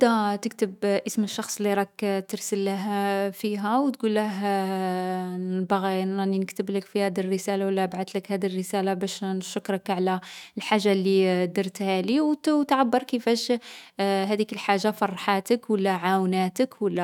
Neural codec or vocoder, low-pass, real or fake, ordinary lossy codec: autoencoder, 48 kHz, 128 numbers a frame, DAC-VAE, trained on Japanese speech; 19.8 kHz; fake; none